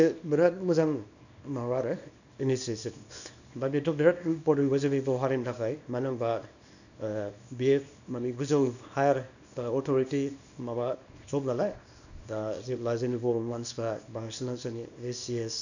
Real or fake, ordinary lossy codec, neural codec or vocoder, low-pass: fake; none; codec, 24 kHz, 0.9 kbps, WavTokenizer, small release; 7.2 kHz